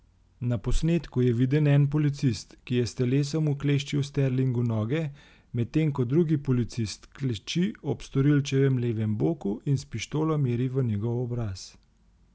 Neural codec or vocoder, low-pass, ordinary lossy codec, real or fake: none; none; none; real